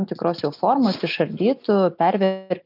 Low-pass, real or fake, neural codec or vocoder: 5.4 kHz; real; none